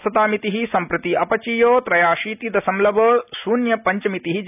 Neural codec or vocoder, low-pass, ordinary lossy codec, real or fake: none; 3.6 kHz; none; real